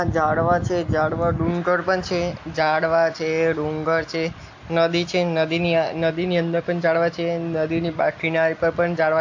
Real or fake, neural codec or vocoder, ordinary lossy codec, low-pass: real; none; AAC, 48 kbps; 7.2 kHz